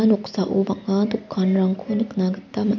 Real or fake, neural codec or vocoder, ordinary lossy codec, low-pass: real; none; none; 7.2 kHz